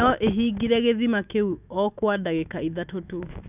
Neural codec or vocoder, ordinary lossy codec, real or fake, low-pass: autoencoder, 48 kHz, 128 numbers a frame, DAC-VAE, trained on Japanese speech; none; fake; 3.6 kHz